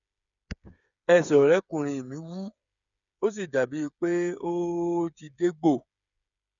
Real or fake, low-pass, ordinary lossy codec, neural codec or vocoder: fake; 7.2 kHz; none; codec, 16 kHz, 8 kbps, FreqCodec, smaller model